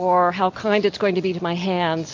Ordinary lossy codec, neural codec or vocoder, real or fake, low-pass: MP3, 48 kbps; none; real; 7.2 kHz